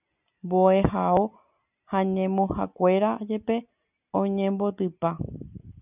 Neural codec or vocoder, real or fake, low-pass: none; real; 3.6 kHz